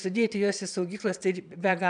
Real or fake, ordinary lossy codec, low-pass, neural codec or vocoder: fake; MP3, 96 kbps; 9.9 kHz; vocoder, 22.05 kHz, 80 mel bands, Vocos